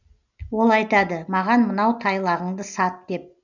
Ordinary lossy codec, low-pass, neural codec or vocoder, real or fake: none; 7.2 kHz; none; real